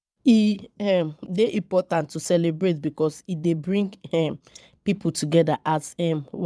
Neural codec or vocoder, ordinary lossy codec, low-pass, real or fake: vocoder, 22.05 kHz, 80 mel bands, Vocos; none; none; fake